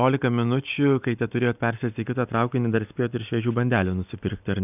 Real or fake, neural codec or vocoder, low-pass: real; none; 3.6 kHz